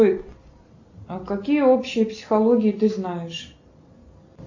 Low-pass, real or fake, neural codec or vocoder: 7.2 kHz; real; none